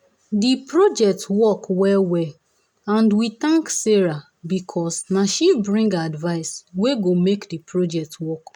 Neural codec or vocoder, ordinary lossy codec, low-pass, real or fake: none; none; 19.8 kHz; real